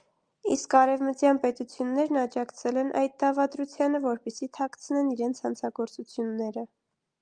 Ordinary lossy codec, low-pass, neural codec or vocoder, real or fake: Opus, 32 kbps; 9.9 kHz; none; real